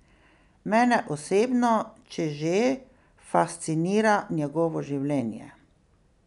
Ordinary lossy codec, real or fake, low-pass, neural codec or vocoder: none; real; 10.8 kHz; none